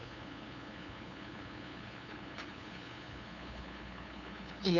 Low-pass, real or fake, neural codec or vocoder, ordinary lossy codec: 7.2 kHz; fake; codec, 16 kHz, 2 kbps, FunCodec, trained on LibriTTS, 25 frames a second; none